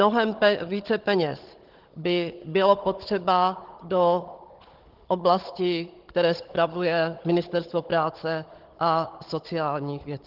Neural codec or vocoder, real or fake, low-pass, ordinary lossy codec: codec, 16 kHz, 16 kbps, FunCodec, trained on Chinese and English, 50 frames a second; fake; 5.4 kHz; Opus, 16 kbps